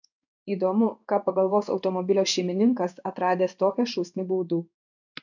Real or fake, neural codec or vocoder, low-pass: fake; codec, 16 kHz in and 24 kHz out, 1 kbps, XY-Tokenizer; 7.2 kHz